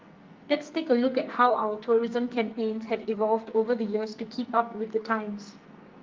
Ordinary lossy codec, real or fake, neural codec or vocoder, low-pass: Opus, 32 kbps; fake; codec, 44.1 kHz, 2.6 kbps, SNAC; 7.2 kHz